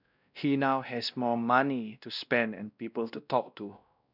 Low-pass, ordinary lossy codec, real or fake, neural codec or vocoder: 5.4 kHz; none; fake; codec, 16 kHz, 1 kbps, X-Codec, WavLM features, trained on Multilingual LibriSpeech